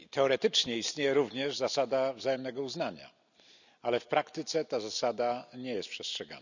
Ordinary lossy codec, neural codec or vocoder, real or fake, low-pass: none; none; real; 7.2 kHz